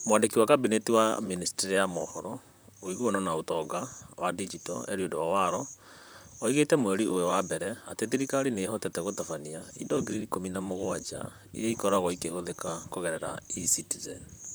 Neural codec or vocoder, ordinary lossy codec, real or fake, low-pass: vocoder, 44.1 kHz, 128 mel bands, Pupu-Vocoder; none; fake; none